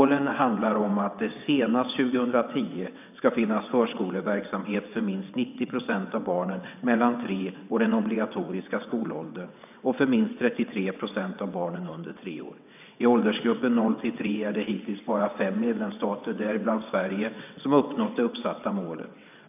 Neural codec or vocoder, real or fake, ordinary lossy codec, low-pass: vocoder, 22.05 kHz, 80 mel bands, WaveNeXt; fake; none; 3.6 kHz